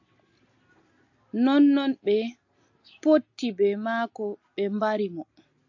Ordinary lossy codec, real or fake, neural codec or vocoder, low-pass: AAC, 48 kbps; real; none; 7.2 kHz